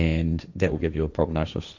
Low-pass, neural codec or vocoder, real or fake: 7.2 kHz; codec, 16 kHz, 1.1 kbps, Voila-Tokenizer; fake